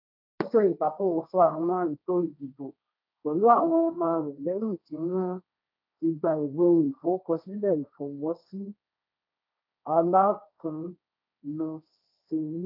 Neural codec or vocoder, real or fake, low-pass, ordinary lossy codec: codec, 16 kHz, 1.1 kbps, Voila-Tokenizer; fake; 5.4 kHz; none